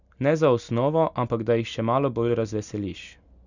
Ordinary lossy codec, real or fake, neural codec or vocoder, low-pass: none; real; none; 7.2 kHz